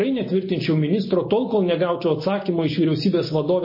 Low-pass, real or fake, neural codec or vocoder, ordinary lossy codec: 5.4 kHz; real; none; MP3, 24 kbps